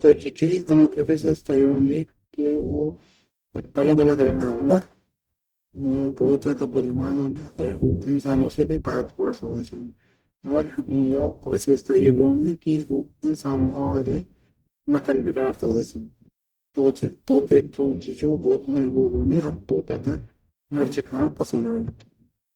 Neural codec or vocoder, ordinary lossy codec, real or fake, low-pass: codec, 44.1 kHz, 0.9 kbps, DAC; Opus, 64 kbps; fake; 19.8 kHz